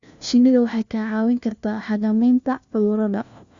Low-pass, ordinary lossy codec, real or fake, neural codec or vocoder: 7.2 kHz; Opus, 64 kbps; fake; codec, 16 kHz, 1 kbps, FunCodec, trained on LibriTTS, 50 frames a second